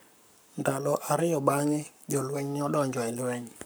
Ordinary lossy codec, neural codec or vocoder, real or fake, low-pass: none; codec, 44.1 kHz, 7.8 kbps, Pupu-Codec; fake; none